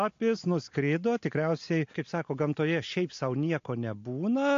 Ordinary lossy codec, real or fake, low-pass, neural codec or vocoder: AAC, 48 kbps; real; 7.2 kHz; none